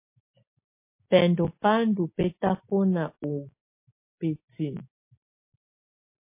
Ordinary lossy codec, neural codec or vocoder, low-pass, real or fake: MP3, 24 kbps; none; 3.6 kHz; real